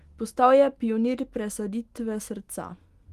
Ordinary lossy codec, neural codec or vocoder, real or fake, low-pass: Opus, 24 kbps; autoencoder, 48 kHz, 128 numbers a frame, DAC-VAE, trained on Japanese speech; fake; 14.4 kHz